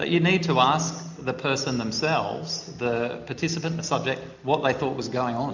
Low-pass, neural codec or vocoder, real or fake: 7.2 kHz; none; real